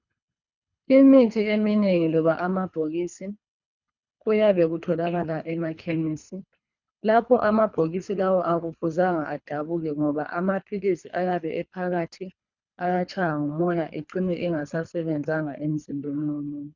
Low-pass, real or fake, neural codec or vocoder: 7.2 kHz; fake; codec, 24 kHz, 3 kbps, HILCodec